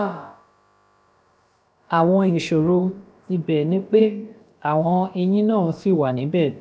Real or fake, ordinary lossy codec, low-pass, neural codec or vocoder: fake; none; none; codec, 16 kHz, about 1 kbps, DyCAST, with the encoder's durations